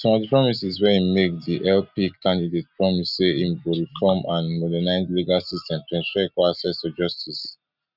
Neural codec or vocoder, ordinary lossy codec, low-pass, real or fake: none; none; 5.4 kHz; real